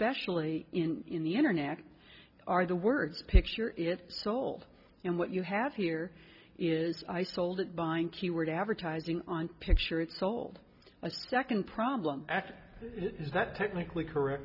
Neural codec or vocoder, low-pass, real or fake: none; 5.4 kHz; real